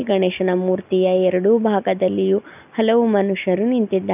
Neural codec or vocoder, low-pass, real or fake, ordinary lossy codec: none; 3.6 kHz; real; none